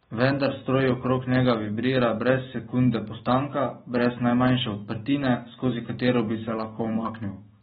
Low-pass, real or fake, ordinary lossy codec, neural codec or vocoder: 19.8 kHz; fake; AAC, 16 kbps; autoencoder, 48 kHz, 128 numbers a frame, DAC-VAE, trained on Japanese speech